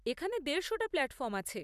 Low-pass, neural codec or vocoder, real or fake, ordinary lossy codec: 14.4 kHz; vocoder, 44.1 kHz, 128 mel bands every 256 samples, BigVGAN v2; fake; none